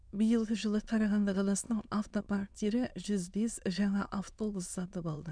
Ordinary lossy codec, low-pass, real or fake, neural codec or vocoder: none; 9.9 kHz; fake; autoencoder, 22.05 kHz, a latent of 192 numbers a frame, VITS, trained on many speakers